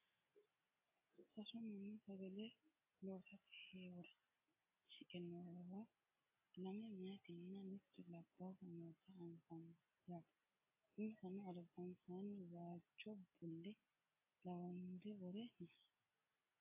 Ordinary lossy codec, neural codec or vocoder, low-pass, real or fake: AAC, 24 kbps; none; 3.6 kHz; real